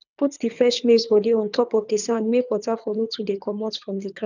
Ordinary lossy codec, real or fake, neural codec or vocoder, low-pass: none; fake; codec, 24 kHz, 3 kbps, HILCodec; 7.2 kHz